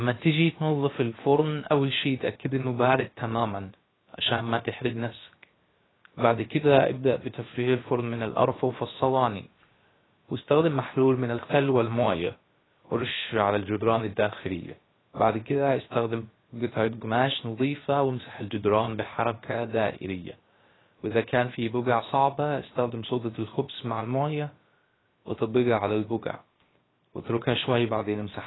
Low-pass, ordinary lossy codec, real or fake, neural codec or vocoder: 7.2 kHz; AAC, 16 kbps; fake; codec, 16 kHz, 0.7 kbps, FocalCodec